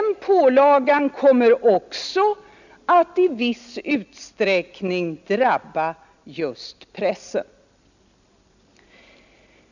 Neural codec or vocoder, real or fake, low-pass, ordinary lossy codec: none; real; 7.2 kHz; none